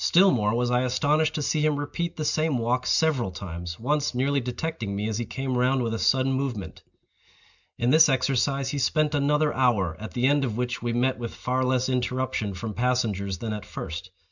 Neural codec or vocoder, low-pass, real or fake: none; 7.2 kHz; real